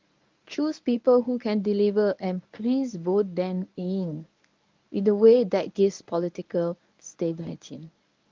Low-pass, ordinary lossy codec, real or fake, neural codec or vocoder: 7.2 kHz; Opus, 24 kbps; fake; codec, 24 kHz, 0.9 kbps, WavTokenizer, medium speech release version 1